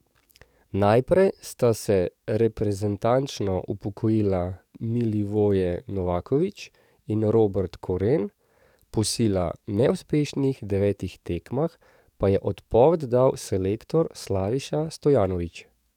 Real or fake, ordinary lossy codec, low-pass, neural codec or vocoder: fake; none; 19.8 kHz; codec, 44.1 kHz, 7.8 kbps, DAC